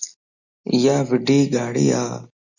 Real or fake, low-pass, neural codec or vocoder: real; 7.2 kHz; none